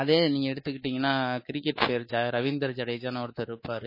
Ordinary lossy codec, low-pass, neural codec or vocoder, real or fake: MP3, 24 kbps; 5.4 kHz; codec, 24 kHz, 3.1 kbps, DualCodec; fake